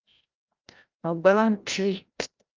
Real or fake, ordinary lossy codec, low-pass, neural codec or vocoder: fake; Opus, 32 kbps; 7.2 kHz; codec, 16 kHz, 0.5 kbps, FreqCodec, larger model